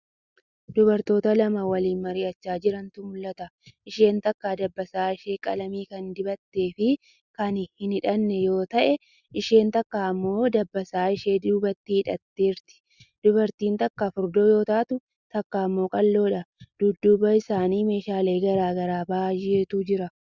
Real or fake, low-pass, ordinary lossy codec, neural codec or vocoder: real; 7.2 kHz; Opus, 64 kbps; none